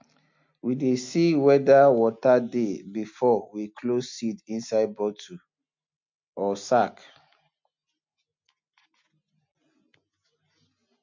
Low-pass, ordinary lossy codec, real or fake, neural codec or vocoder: 7.2 kHz; MP3, 48 kbps; real; none